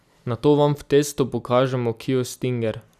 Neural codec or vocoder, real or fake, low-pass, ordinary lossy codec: none; real; 14.4 kHz; none